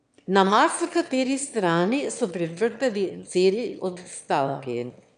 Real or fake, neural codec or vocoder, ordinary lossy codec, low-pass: fake; autoencoder, 22.05 kHz, a latent of 192 numbers a frame, VITS, trained on one speaker; none; 9.9 kHz